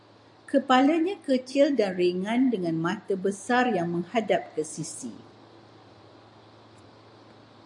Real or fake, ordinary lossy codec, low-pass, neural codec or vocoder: real; AAC, 64 kbps; 10.8 kHz; none